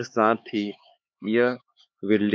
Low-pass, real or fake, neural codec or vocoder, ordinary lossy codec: none; fake; codec, 16 kHz, 4 kbps, X-Codec, HuBERT features, trained on LibriSpeech; none